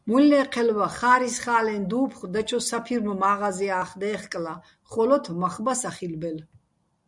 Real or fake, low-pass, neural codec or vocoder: real; 10.8 kHz; none